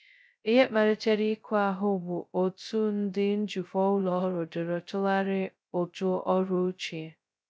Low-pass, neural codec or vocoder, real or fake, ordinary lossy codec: none; codec, 16 kHz, 0.2 kbps, FocalCodec; fake; none